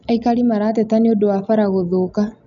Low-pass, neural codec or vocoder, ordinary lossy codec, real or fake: 7.2 kHz; none; none; real